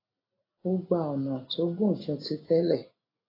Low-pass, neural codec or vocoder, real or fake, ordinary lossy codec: 5.4 kHz; none; real; AAC, 24 kbps